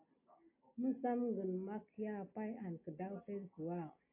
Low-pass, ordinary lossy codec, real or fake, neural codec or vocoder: 3.6 kHz; AAC, 32 kbps; real; none